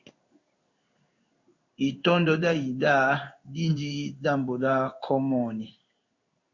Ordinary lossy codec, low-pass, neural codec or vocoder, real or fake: Opus, 64 kbps; 7.2 kHz; codec, 16 kHz in and 24 kHz out, 1 kbps, XY-Tokenizer; fake